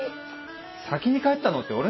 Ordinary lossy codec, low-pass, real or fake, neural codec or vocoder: MP3, 24 kbps; 7.2 kHz; real; none